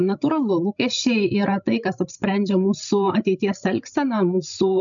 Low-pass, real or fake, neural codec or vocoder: 7.2 kHz; fake; codec, 16 kHz, 16 kbps, FreqCodec, larger model